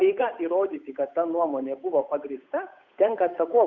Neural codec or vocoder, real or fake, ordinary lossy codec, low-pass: codec, 16 kHz, 8 kbps, FunCodec, trained on Chinese and English, 25 frames a second; fake; Opus, 64 kbps; 7.2 kHz